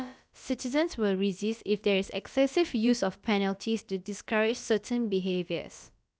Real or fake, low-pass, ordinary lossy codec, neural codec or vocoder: fake; none; none; codec, 16 kHz, about 1 kbps, DyCAST, with the encoder's durations